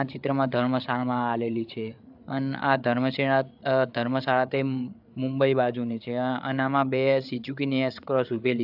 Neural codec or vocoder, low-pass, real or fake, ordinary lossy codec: codec, 16 kHz, 8 kbps, FreqCodec, larger model; 5.4 kHz; fake; AAC, 48 kbps